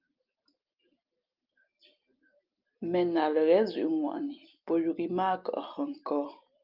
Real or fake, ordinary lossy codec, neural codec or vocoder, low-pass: real; Opus, 24 kbps; none; 5.4 kHz